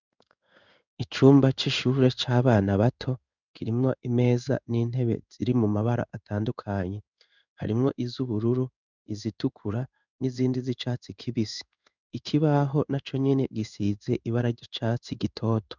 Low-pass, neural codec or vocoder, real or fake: 7.2 kHz; codec, 16 kHz in and 24 kHz out, 1 kbps, XY-Tokenizer; fake